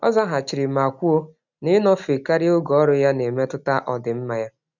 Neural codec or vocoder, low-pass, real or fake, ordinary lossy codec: none; 7.2 kHz; real; none